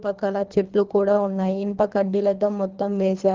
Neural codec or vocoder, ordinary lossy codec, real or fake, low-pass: codec, 24 kHz, 3 kbps, HILCodec; Opus, 16 kbps; fake; 7.2 kHz